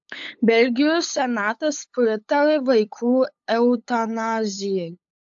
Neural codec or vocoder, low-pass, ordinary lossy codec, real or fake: codec, 16 kHz, 8 kbps, FunCodec, trained on LibriTTS, 25 frames a second; 7.2 kHz; AAC, 64 kbps; fake